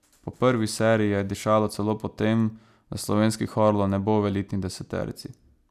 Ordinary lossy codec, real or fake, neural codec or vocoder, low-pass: none; real; none; 14.4 kHz